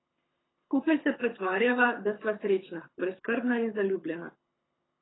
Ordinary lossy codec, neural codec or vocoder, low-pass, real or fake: AAC, 16 kbps; codec, 24 kHz, 6 kbps, HILCodec; 7.2 kHz; fake